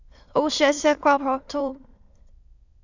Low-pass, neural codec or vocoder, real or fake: 7.2 kHz; autoencoder, 22.05 kHz, a latent of 192 numbers a frame, VITS, trained on many speakers; fake